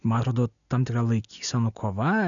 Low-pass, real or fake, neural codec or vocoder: 7.2 kHz; real; none